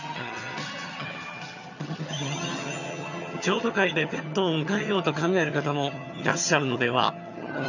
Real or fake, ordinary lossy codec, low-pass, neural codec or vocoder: fake; none; 7.2 kHz; vocoder, 22.05 kHz, 80 mel bands, HiFi-GAN